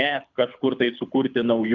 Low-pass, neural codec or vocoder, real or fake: 7.2 kHz; codec, 24 kHz, 6 kbps, HILCodec; fake